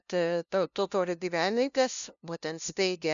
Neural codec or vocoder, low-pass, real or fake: codec, 16 kHz, 0.5 kbps, FunCodec, trained on LibriTTS, 25 frames a second; 7.2 kHz; fake